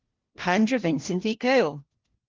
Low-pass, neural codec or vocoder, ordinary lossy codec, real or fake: 7.2 kHz; codec, 16 kHz, 1 kbps, FunCodec, trained on LibriTTS, 50 frames a second; Opus, 16 kbps; fake